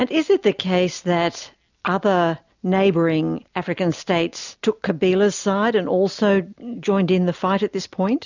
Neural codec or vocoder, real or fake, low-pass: none; real; 7.2 kHz